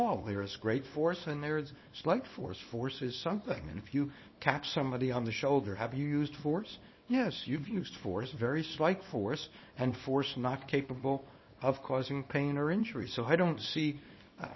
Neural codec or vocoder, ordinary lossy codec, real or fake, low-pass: codec, 24 kHz, 0.9 kbps, WavTokenizer, small release; MP3, 24 kbps; fake; 7.2 kHz